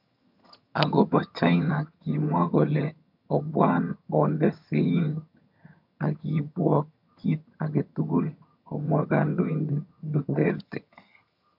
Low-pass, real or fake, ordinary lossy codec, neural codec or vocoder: 5.4 kHz; fake; none; vocoder, 22.05 kHz, 80 mel bands, HiFi-GAN